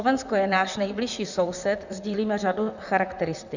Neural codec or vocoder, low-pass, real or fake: vocoder, 44.1 kHz, 128 mel bands, Pupu-Vocoder; 7.2 kHz; fake